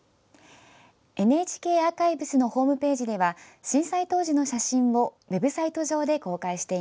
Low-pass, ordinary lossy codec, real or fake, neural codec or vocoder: none; none; real; none